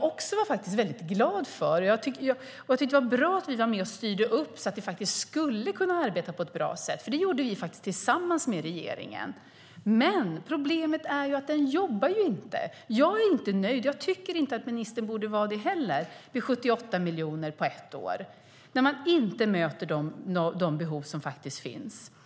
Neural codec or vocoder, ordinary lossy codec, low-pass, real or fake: none; none; none; real